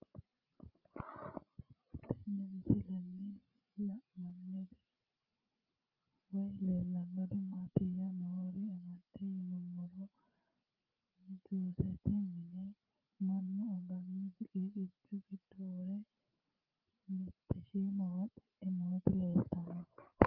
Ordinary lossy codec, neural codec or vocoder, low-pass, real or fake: Opus, 32 kbps; codec, 16 kHz, 16 kbps, FreqCodec, larger model; 5.4 kHz; fake